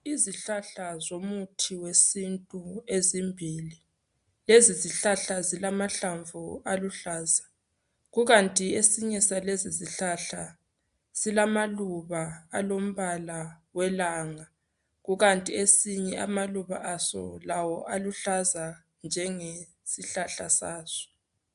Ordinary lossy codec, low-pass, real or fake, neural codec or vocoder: AAC, 96 kbps; 10.8 kHz; real; none